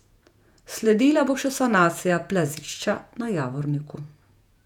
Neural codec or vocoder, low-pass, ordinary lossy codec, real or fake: vocoder, 48 kHz, 128 mel bands, Vocos; 19.8 kHz; none; fake